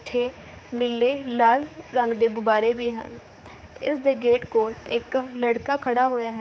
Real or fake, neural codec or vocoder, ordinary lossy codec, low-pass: fake; codec, 16 kHz, 4 kbps, X-Codec, HuBERT features, trained on general audio; none; none